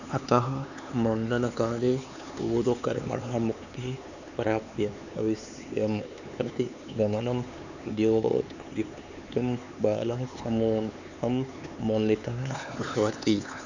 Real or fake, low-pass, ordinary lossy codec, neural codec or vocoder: fake; 7.2 kHz; none; codec, 16 kHz, 4 kbps, X-Codec, HuBERT features, trained on LibriSpeech